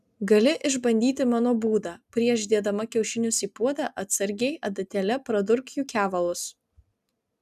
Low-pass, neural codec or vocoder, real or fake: 14.4 kHz; none; real